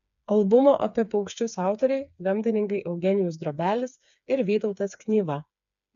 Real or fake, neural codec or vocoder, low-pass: fake; codec, 16 kHz, 4 kbps, FreqCodec, smaller model; 7.2 kHz